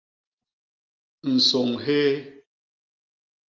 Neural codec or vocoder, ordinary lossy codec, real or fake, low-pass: none; Opus, 32 kbps; real; 7.2 kHz